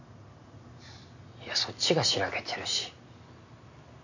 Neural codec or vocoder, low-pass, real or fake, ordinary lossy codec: none; 7.2 kHz; real; none